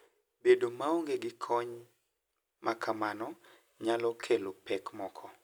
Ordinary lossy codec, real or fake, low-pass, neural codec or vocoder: none; real; none; none